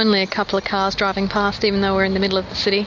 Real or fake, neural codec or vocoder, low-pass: real; none; 7.2 kHz